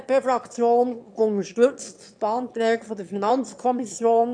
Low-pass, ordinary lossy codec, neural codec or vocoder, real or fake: 9.9 kHz; AAC, 64 kbps; autoencoder, 22.05 kHz, a latent of 192 numbers a frame, VITS, trained on one speaker; fake